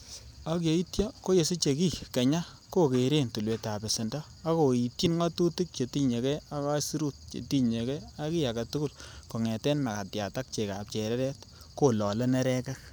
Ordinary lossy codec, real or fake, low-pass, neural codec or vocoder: none; fake; none; vocoder, 44.1 kHz, 128 mel bands every 512 samples, BigVGAN v2